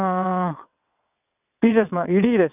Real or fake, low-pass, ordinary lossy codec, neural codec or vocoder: fake; 3.6 kHz; none; vocoder, 22.05 kHz, 80 mel bands, WaveNeXt